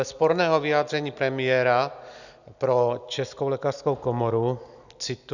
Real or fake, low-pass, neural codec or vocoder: real; 7.2 kHz; none